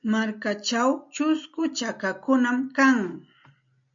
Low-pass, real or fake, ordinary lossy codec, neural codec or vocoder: 7.2 kHz; real; MP3, 64 kbps; none